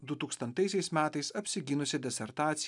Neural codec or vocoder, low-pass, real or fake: none; 10.8 kHz; real